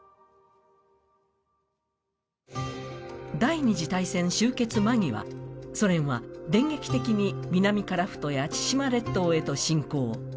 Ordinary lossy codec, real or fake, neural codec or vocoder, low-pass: none; real; none; none